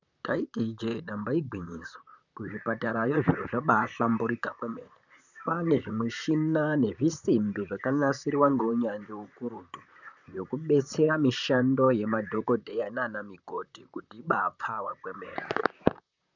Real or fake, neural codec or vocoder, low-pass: fake; vocoder, 44.1 kHz, 128 mel bands, Pupu-Vocoder; 7.2 kHz